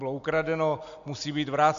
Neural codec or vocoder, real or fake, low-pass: none; real; 7.2 kHz